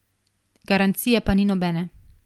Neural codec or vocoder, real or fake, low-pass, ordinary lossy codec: none; real; 19.8 kHz; Opus, 24 kbps